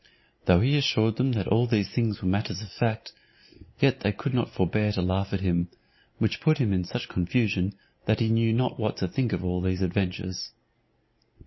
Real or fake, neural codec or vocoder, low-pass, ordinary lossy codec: real; none; 7.2 kHz; MP3, 24 kbps